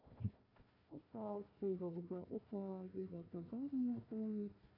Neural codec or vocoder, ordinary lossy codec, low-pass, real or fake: codec, 16 kHz, 1 kbps, FunCodec, trained on Chinese and English, 50 frames a second; none; 5.4 kHz; fake